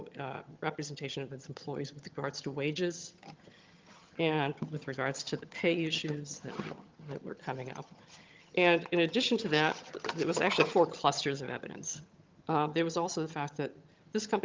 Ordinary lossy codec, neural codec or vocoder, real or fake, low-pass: Opus, 32 kbps; vocoder, 22.05 kHz, 80 mel bands, HiFi-GAN; fake; 7.2 kHz